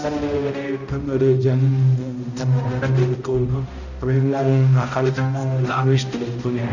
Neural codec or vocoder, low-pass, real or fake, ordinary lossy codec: codec, 16 kHz, 0.5 kbps, X-Codec, HuBERT features, trained on balanced general audio; 7.2 kHz; fake; none